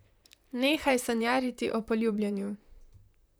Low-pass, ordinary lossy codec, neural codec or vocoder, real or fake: none; none; vocoder, 44.1 kHz, 128 mel bands, Pupu-Vocoder; fake